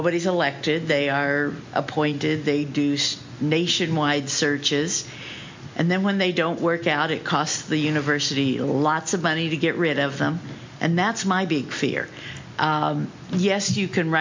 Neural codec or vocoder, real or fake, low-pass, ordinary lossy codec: none; real; 7.2 kHz; MP3, 48 kbps